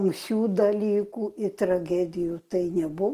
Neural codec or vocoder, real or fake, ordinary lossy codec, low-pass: none; real; Opus, 24 kbps; 14.4 kHz